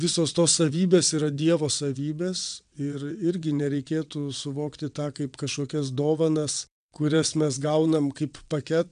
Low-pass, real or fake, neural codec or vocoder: 9.9 kHz; fake; vocoder, 22.05 kHz, 80 mel bands, WaveNeXt